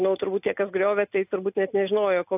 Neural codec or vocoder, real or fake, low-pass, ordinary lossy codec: none; real; 3.6 kHz; AAC, 32 kbps